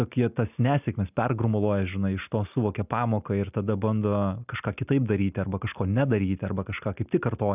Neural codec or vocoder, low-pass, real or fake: none; 3.6 kHz; real